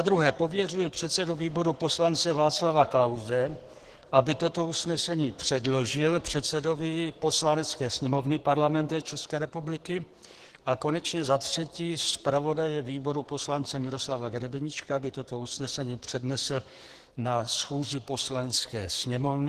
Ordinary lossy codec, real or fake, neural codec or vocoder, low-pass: Opus, 16 kbps; fake; codec, 44.1 kHz, 2.6 kbps, SNAC; 14.4 kHz